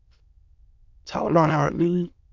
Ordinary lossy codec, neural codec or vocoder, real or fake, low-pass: MP3, 64 kbps; autoencoder, 22.05 kHz, a latent of 192 numbers a frame, VITS, trained on many speakers; fake; 7.2 kHz